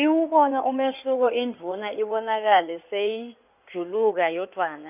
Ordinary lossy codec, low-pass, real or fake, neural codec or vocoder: none; 3.6 kHz; fake; codec, 16 kHz in and 24 kHz out, 2.2 kbps, FireRedTTS-2 codec